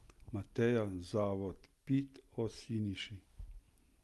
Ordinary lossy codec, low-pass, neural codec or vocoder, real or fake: Opus, 32 kbps; 14.4 kHz; none; real